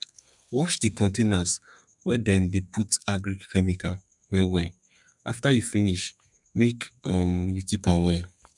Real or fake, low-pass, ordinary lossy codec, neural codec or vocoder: fake; 10.8 kHz; none; codec, 32 kHz, 1.9 kbps, SNAC